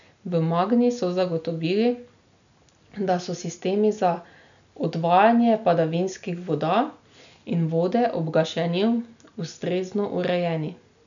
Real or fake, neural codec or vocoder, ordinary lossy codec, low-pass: real; none; none; 7.2 kHz